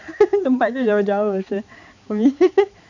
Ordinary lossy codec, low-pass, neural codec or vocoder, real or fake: none; 7.2 kHz; codec, 16 kHz, 4 kbps, X-Codec, HuBERT features, trained on balanced general audio; fake